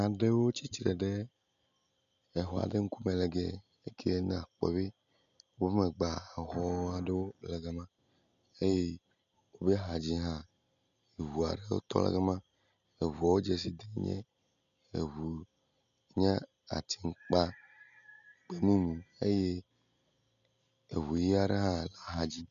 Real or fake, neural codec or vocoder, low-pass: real; none; 7.2 kHz